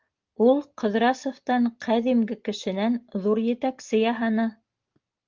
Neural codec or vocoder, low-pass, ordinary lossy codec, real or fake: none; 7.2 kHz; Opus, 32 kbps; real